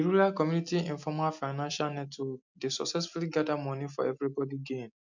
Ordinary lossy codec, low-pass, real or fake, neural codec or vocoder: MP3, 64 kbps; 7.2 kHz; real; none